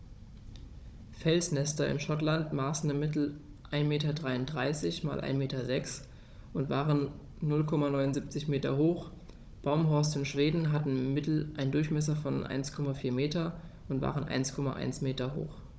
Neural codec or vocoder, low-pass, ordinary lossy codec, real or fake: codec, 16 kHz, 16 kbps, FunCodec, trained on Chinese and English, 50 frames a second; none; none; fake